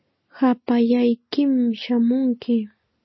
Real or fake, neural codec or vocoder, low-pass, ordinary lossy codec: real; none; 7.2 kHz; MP3, 24 kbps